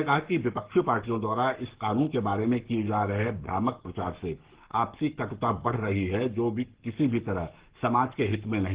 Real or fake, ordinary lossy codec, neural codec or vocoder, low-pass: fake; Opus, 16 kbps; codec, 44.1 kHz, 7.8 kbps, Pupu-Codec; 3.6 kHz